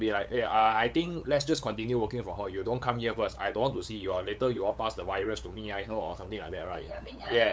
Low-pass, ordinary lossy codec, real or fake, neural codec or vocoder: none; none; fake; codec, 16 kHz, 8 kbps, FunCodec, trained on LibriTTS, 25 frames a second